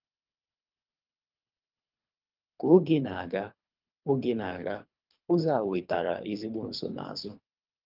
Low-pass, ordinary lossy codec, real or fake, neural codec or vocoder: 5.4 kHz; Opus, 32 kbps; fake; codec, 24 kHz, 3 kbps, HILCodec